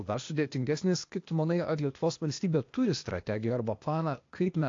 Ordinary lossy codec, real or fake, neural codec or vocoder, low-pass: AAC, 48 kbps; fake; codec, 16 kHz, 0.8 kbps, ZipCodec; 7.2 kHz